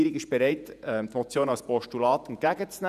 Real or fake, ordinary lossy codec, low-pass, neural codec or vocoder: fake; none; 14.4 kHz; vocoder, 48 kHz, 128 mel bands, Vocos